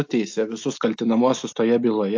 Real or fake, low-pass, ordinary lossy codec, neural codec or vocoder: real; 7.2 kHz; AAC, 48 kbps; none